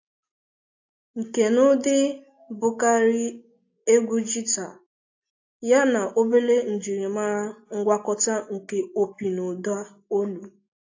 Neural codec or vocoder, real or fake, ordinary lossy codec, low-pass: none; real; AAC, 48 kbps; 7.2 kHz